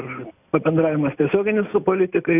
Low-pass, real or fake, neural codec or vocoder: 3.6 kHz; real; none